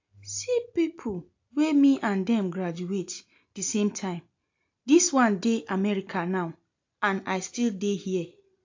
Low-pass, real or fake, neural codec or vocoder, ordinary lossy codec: 7.2 kHz; real; none; AAC, 48 kbps